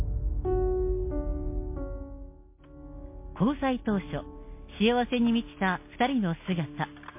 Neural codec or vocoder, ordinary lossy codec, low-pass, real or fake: none; MP3, 24 kbps; 3.6 kHz; real